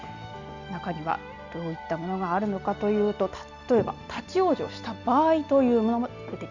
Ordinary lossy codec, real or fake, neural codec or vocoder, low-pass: none; real; none; 7.2 kHz